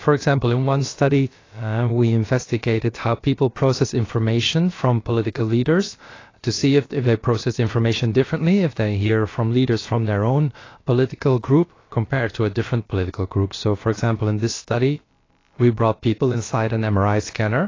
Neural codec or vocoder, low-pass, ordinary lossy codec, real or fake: codec, 16 kHz, about 1 kbps, DyCAST, with the encoder's durations; 7.2 kHz; AAC, 32 kbps; fake